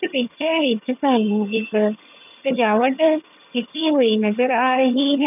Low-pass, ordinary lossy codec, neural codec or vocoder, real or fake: 3.6 kHz; none; vocoder, 22.05 kHz, 80 mel bands, HiFi-GAN; fake